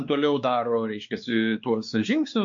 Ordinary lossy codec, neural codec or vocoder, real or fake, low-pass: MP3, 48 kbps; codec, 16 kHz, 2 kbps, X-Codec, WavLM features, trained on Multilingual LibriSpeech; fake; 7.2 kHz